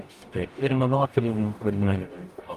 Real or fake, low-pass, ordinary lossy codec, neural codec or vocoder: fake; 14.4 kHz; Opus, 32 kbps; codec, 44.1 kHz, 0.9 kbps, DAC